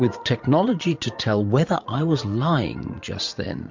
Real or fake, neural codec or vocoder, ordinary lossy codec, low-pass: fake; vocoder, 44.1 kHz, 80 mel bands, Vocos; MP3, 64 kbps; 7.2 kHz